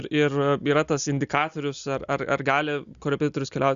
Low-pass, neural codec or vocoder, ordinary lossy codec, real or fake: 7.2 kHz; none; Opus, 64 kbps; real